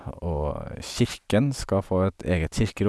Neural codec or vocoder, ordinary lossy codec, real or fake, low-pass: none; none; real; none